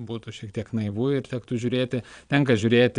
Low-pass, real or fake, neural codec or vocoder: 9.9 kHz; fake; vocoder, 22.05 kHz, 80 mel bands, Vocos